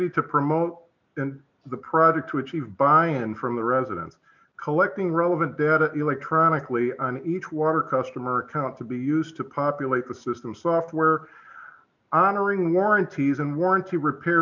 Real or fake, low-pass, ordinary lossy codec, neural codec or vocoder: real; 7.2 kHz; MP3, 64 kbps; none